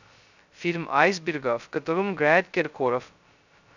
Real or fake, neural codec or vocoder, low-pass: fake; codec, 16 kHz, 0.2 kbps, FocalCodec; 7.2 kHz